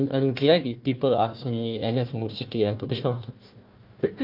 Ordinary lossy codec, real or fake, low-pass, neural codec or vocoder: Opus, 24 kbps; fake; 5.4 kHz; codec, 16 kHz, 1 kbps, FunCodec, trained on Chinese and English, 50 frames a second